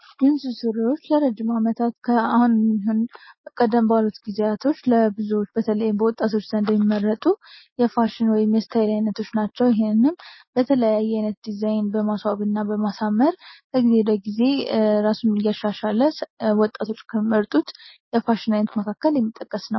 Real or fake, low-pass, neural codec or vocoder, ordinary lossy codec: real; 7.2 kHz; none; MP3, 24 kbps